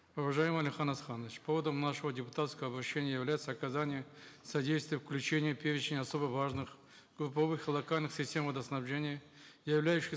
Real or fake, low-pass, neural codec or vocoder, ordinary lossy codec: real; none; none; none